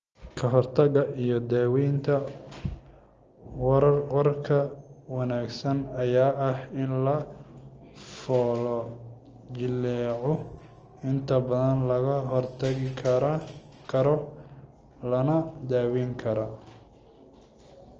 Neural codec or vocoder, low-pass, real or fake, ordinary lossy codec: none; 7.2 kHz; real; Opus, 24 kbps